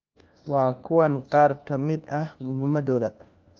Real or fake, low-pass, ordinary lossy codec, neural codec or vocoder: fake; 7.2 kHz; Opus, 24 kbps; codec, 16 kHz, 1 kbps, FunCodec, trained on LibriTTS, 50 frames a second